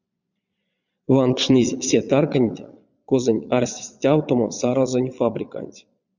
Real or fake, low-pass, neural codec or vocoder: fake; 7.2 kHz; vocoder, 22.05 kHz, 80 mel bands, Vocos